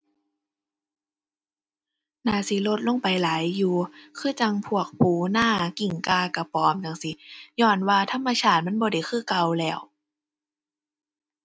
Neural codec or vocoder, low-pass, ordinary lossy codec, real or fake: none; none; none; real